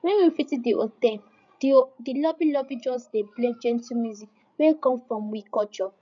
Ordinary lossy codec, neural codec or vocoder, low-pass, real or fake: none; codec, 16 kHz, 8 kbps, FreqCodec, larger model; 7.2 kHz; fake